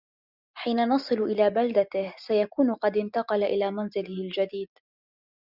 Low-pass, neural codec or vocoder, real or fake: 5.4 kHz; none; real